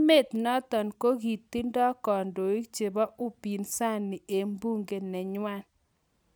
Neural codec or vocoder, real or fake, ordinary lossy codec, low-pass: none; real; none; none